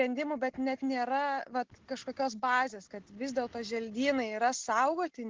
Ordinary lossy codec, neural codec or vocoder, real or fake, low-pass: Opus, 16 kbps; none; real; 7.2 kHz